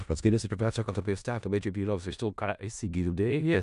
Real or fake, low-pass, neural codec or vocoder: fake; 10.8 kHz; codec, 16 kHz in and 24 kHz out, 0.4 kbps, LongCat-Audio-Codec, four codebook decoder